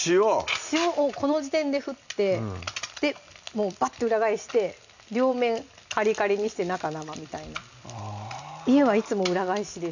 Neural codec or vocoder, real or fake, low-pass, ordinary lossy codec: none; real; 7.2 kHz; none